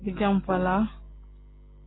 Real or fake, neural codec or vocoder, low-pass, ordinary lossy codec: real; none; 7.2 kHz; AAC, 16 kbps